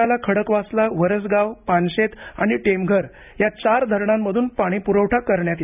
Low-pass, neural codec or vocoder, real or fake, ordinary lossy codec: 3.6 kHz; none; real; none